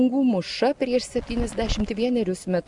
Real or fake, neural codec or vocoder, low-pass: real; none; 10.8 kHz